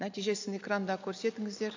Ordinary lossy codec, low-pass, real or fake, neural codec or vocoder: AAC, 48 kbps; 7.2 kHz; real; none